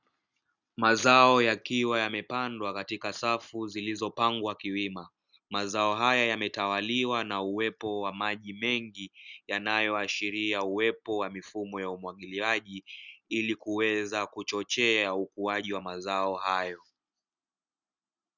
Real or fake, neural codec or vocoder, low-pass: real; none; 7.2 kHz